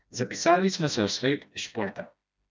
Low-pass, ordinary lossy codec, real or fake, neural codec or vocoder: none; none; fake; codec, 16 kHz, 1 kbps, FreqCodec, smaller model